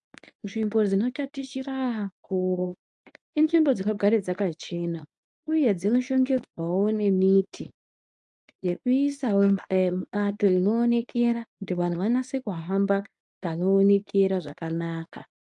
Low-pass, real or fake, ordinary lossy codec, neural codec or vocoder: 10.8 kHz; fake; AAC, 64 kbps; codec, 24 kHz, 0.9 kbps, WavTokenizer, medium speech release version 1